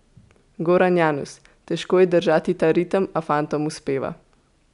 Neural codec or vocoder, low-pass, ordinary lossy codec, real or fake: none; 10.8 kHz; none; real